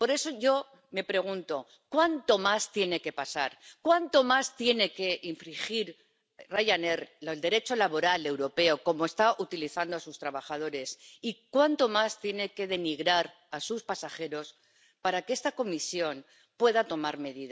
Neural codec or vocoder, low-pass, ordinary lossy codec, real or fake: none; none; none; real